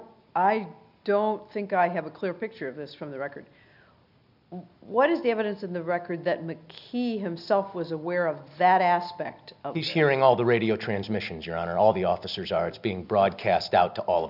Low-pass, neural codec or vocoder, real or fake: 5.4 kHz; none; real